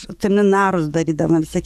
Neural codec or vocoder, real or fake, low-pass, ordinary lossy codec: codec, 44.1 kHz, 7.8 kbps, DAC; fake; 14.4 kHz; AAC, 96 kbps